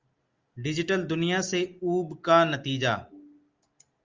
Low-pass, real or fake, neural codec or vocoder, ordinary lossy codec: 7.2 kHz; real; none; Opus, 32 kbps